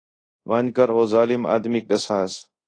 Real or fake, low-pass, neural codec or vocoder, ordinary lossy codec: fake; 9.9 kHz; codec, 24 kHz, 0.5 kbps, DualCodec; AAC, 32 kbps